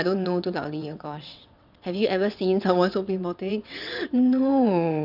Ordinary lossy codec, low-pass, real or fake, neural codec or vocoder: none; 5.4 kHz; fake; vocoder, 22.05 kHz, 80 mel bands, WaveNeXt